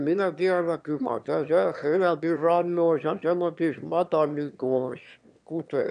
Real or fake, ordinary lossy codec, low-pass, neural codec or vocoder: fake; none; 9.9 kHz; autoencoder, 22.05 kHz, a latent of 192 numbers a frame, VITS, trained on one speaker